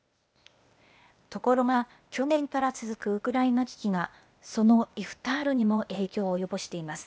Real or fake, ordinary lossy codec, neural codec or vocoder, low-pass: fake; none; codec, 16 kHz, 0.8 kbps, ZipCodec; none